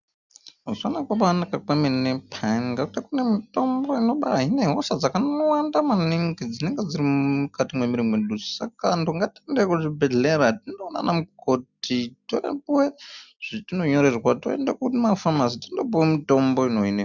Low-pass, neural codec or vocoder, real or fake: 7.2 kHz; none; real